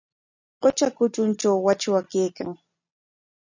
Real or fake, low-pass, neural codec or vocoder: real; 7.2 kHz; none